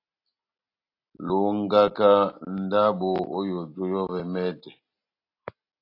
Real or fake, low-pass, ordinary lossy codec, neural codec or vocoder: real; 5.4 kHz; AAC, 32 kbps; none